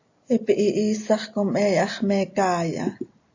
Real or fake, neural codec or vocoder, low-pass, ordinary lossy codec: real; none; 7.2 kHz; AAC, 48 kbps